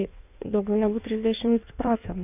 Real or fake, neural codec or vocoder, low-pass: fake; codec, 16 kHz in and 24 kHz out, 1.1 kbps, FireRedTTS-2 codec; 3.6 kHz